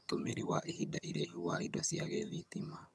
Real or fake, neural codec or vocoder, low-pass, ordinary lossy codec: fake; vocoder, 22.05 kHz, 80 mel bands, HiFi-GAN; none; none